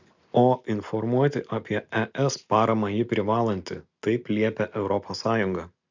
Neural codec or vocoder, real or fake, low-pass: none; real; 7.2 kHz